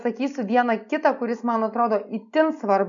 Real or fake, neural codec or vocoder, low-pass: real; none; 7.2 kHz